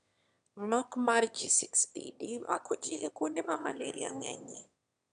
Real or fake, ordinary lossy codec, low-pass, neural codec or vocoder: fake; none; 9.9 kHz; autoencoder, 22.05 kHz, a latent of 192 numbers a frame, VITS, trained on one speaker